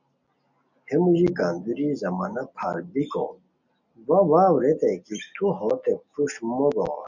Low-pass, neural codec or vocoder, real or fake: 7.2 kHz; none; real